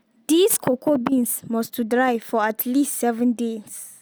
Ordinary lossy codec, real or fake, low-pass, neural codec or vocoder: none; real; none; none